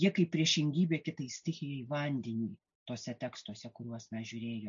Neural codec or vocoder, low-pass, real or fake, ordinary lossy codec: none; 7.2 kHz; real; AAC, 64 kbps